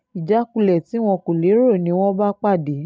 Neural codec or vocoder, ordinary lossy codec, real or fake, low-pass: none; none; real; none